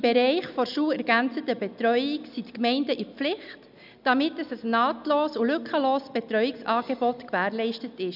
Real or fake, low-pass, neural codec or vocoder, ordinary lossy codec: real; 5.4 kHz; none; none